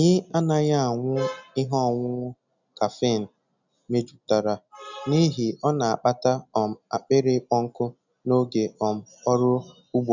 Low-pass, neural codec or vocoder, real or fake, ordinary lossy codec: 7.2 kHz; none; real; none